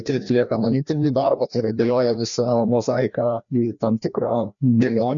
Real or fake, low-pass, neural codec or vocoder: fake; 7.2 kHz; codec, 16 kHz, 1 kbps, FreqCodec, larger model